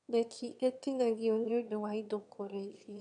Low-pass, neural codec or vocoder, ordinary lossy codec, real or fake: 9.9 kHz; autoencoder, 22.05 kHz, a latent of 192 numbers a frame, VITS, trained on one speaker; none; fake